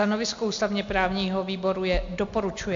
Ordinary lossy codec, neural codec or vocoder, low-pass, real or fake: MP3, 48 kbps; none; 7.2 kHz; real